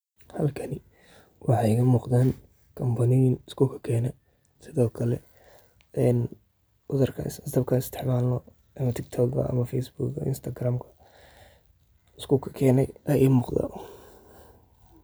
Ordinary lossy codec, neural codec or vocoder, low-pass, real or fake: none; none; none; real